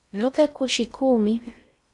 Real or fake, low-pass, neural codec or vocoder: fake; 10.8 kHz; codec, 16 kHz in and 24 kHz out, 0.6 kbps, FocalCodec, streaming, 4096 codes